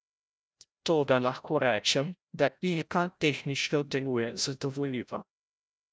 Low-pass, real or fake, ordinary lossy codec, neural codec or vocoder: none; fake; none; codec, 16 kHz, 0.5 kbps, FreqCodec, larger model